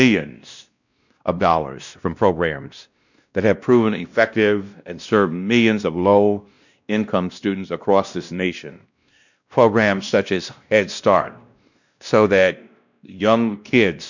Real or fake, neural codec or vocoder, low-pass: fake; codec, 16 kHz, 1 kbps, X-Codec, WavLM features, trained on Multilingual LibriSpeech; 7.2 kHz